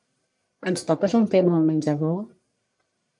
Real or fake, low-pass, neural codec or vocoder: fake; 10.8 kHz; codec, 44.1 kHz, 1.7 kbps, Pupu-Codec